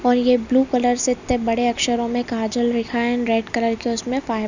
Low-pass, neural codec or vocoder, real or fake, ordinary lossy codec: 7.2 kHz; none; real; none